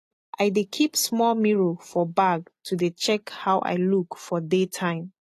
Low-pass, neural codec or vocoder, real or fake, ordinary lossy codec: 14.4 kHz; none; real; AAC, 48 kbps